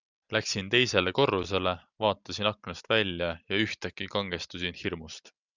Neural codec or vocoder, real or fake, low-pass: none; real; 7.2 kHz